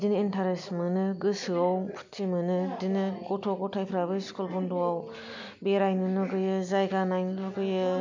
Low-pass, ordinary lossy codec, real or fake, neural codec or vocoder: 7.2 kHz; MP3, 64 kbps; real; none